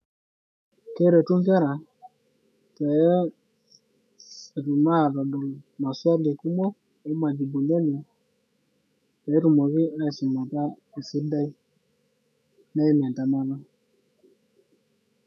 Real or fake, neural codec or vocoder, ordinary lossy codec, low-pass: fake; autoencoder, 48 kHz, 128 numbers a frame, DAC-VAE, trained on Japanese speech; none; 14.4 kHz